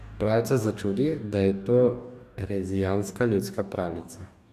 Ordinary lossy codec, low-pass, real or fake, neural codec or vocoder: none; 14.4 kHz; fake; codec, 44.1 kHz, 2.6 kbps, DAC